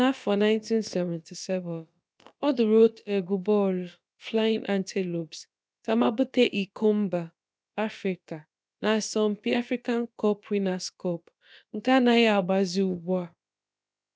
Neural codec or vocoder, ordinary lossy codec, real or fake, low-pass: codec, 16 kHz, about 1 kbps, DyCAST, with the encoder's durations; none; fake; none